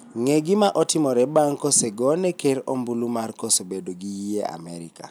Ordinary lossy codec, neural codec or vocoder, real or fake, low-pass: none; none; real; none